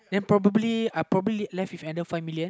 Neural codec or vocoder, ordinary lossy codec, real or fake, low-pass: none; none; real; none